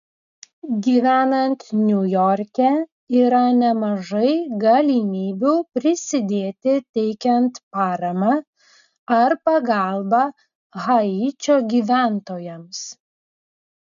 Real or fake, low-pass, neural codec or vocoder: real; 7.2 kHz; none